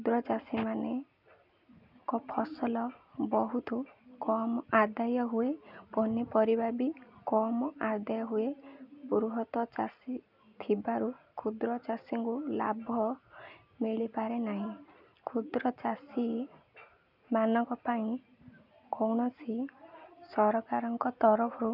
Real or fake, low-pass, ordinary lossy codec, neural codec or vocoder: real; 5.4 kHz; none; none